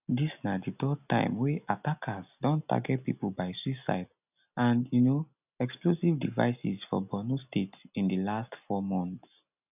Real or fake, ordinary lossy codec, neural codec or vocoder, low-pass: real; none; none; 3.6 kHz